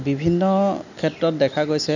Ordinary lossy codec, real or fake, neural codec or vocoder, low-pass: none; real; none; 7.2 kHz